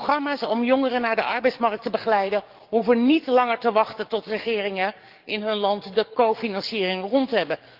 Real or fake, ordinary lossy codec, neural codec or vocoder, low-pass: fake; Opus, 24 kbps; codec, 44.1 kHz, 7.8 kbps, DAC; 5.4 kHz